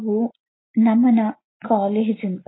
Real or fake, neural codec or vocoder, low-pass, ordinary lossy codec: real; none; 7.2 kHz; AAC, 16 kbps